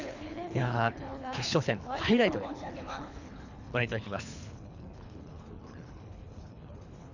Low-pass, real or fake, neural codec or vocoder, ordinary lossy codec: 7.2 kHz; fake; codec, 24 kHz, 3 kbps, HILCodec; none